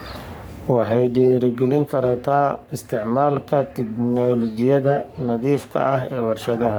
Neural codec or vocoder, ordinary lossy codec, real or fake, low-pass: codec, 44.1 kHz, 3.4 kbps, Pupu-Codec; none; fake; none